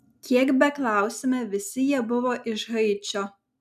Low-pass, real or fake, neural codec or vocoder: 14.4 kHz; real; none